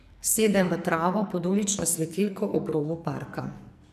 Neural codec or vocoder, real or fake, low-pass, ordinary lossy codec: codec, 44.1 kHz, 2.6 kbps, SNAC; fake; 14.4 kHz; none